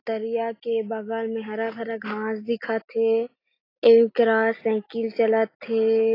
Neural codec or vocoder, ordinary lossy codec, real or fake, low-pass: none; AAC, 24 kbps; real; 5.4 kHz